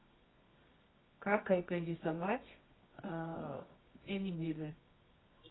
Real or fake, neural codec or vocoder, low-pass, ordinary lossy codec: fake; codec, 24 kHz, 0.9 kbps, WavTokenizer, medium music audio release; 7.2 kHz; AAC, 16 kbps